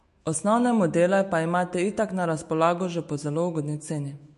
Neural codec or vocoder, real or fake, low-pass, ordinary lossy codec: autoencoder, 48 kHz, 128 numbers a frame, DAC-VAE, trained on Japanese speech; fake; 14.4 kHz; MP3, 48 kbps